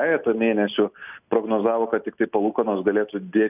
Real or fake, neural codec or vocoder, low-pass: real; none; 3.6 kHz